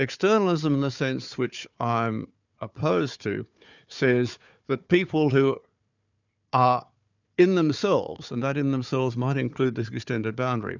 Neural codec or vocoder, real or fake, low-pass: codec, 16 kHz, 4 kbps, FunCodec, trained on Chinese and English, 50 frames a second; fake; 7.2 kHz